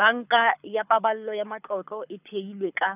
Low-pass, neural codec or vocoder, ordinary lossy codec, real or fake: 3.6 kHz; codec, 24 kHz, 6 kbps, HILCodec; none; fake